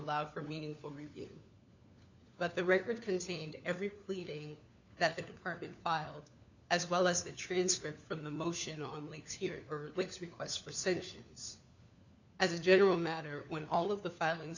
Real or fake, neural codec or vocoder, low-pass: fake; codec, 16 kHz, 4 kbps, FunCodec, trained on LibriTTS, 50 frames a second; 7.2 kHz